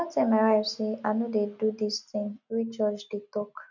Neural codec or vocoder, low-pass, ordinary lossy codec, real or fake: none; 7.2 kHz; none; real